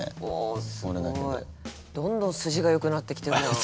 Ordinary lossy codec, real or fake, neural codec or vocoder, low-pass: none; real; none; none